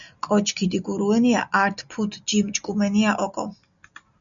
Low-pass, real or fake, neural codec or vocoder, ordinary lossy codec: 7.2 kHz; real; none; MP3, 96 kbps